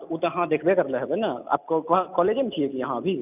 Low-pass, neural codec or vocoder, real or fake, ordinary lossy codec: 3.6 kHz; none; real; none